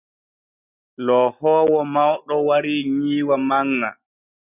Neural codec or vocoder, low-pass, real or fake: none; 3.6 kHz; real